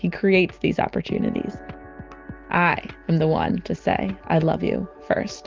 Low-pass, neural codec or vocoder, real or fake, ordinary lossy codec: 7.2 kHz; none; real; Opus, 24 kbps